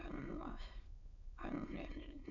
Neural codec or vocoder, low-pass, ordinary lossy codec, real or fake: autoencoder, 22.05 kHz, a latent of 192 numbers a frame, VITS, trained on many speakers; 7.2 kHz; none; fake